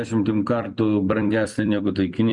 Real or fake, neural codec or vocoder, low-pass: fake; vocoder, 44.1 kHz, 128 mel bands every 256 samples, BigVGAN v2; 10.8 kHz